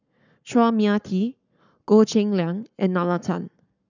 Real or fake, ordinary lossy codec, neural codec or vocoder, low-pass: fake; none; codec, 44.1 kHz, 7.8 kbps, Pupu-Codec; 7.2 kHz